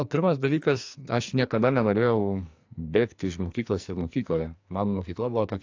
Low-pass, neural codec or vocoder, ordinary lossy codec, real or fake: 7.2 kHz; codec, 44.1 kHz, 2.6 kbps, SNAC; AAC, 48 kbps; fake